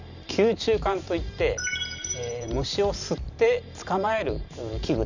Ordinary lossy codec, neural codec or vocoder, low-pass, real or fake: none; vocoder, 44.1 kHz, 128 mel bands every 512 samples, BigVGAN v2; 7.2 kHz; fake